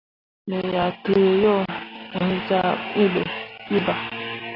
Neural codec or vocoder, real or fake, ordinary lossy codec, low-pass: none; real; AAC, 24 kbps; 5.4 kHz